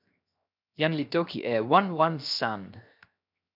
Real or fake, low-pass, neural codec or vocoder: fake; 5.4 kHz; codec, 16 kHz, 0.7 kbps, FocalCodec